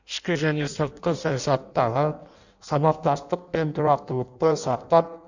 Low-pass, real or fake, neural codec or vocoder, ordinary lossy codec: 7.2 kHz; fake; codec, 16 kHz in and 24 kHz out, 0.6 kbps, FireRedTTS-2 codec; none